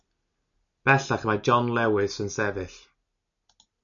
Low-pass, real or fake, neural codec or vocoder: 7.2 kHz; real; none